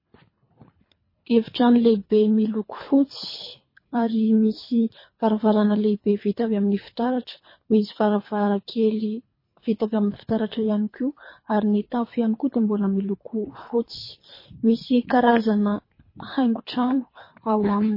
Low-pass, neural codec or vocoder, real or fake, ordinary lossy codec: 5.4 kHz; codec, 24 kHz, 3 kbps, HILCodec; fake; MP3, 24 kbps